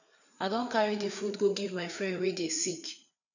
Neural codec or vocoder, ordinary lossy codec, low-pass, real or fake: codec, 16 kHz, 4 kbps, FreqCodec, larger model; none; 7.2 kHz; fake